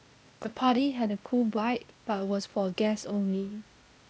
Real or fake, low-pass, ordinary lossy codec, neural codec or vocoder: fake; none; none; codec, 16 kHz, 0.8 kbps, ZipCodec